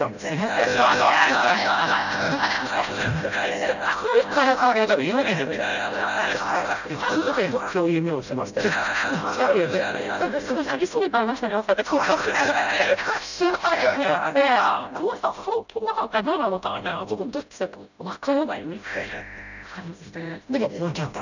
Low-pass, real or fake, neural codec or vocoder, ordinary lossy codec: 7.2 kHz; fake; codec, 16 kHz, 0.5 kbps, FreqCodec, smaller model; none